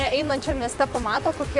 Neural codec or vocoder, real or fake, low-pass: vocoder, 44.1 kHz, 128 mel bands, Pupu-Vocoder; fake; 10.8 kHz